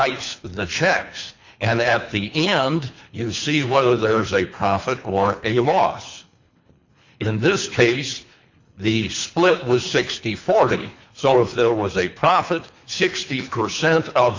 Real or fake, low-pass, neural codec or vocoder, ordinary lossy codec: fake; 7.2 kHz; codec, 24 kHz, 3 kbps, HILCodec; MP3, 64 kbps